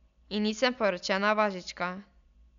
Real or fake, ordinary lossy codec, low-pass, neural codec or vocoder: real; none; 7.2 kHz; none